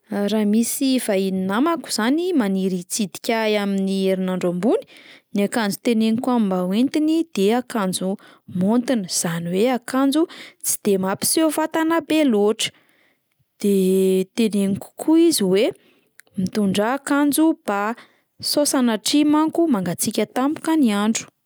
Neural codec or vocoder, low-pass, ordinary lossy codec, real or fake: none; none; none; real